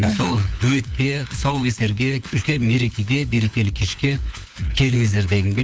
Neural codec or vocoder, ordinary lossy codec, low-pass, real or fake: codec, 16 kHz, 4 kbps, FunCodec, trained on LibriTTS, 50 frames a second; none; none; fake